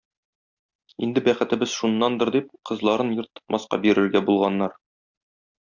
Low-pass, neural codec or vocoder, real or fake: 7.2 kHz; none; real